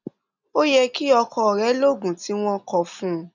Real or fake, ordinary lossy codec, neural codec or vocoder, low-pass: real; none; none; 7.2 kHz